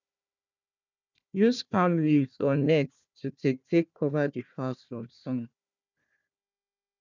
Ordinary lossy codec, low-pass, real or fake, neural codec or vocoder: none; 7.2 kHz; fake; codec, 16 kHz, 1 kbps, FunCodec, trained on Chinese and English, 50 frames a second